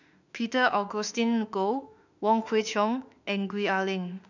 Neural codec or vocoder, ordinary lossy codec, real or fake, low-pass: autoencoder, 48 kHz, 32 numbers a frame, DAC-VAE, trained on Japanese speech; none; fake; 7.2 kHz